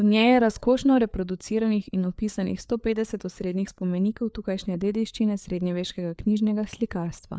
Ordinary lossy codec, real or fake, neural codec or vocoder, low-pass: none; fake; codec, 16 kHz, 4 kbps, FreqCodec, larger model; none